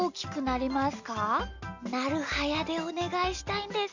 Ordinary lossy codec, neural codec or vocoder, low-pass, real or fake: none; none; 7.2 kHz; real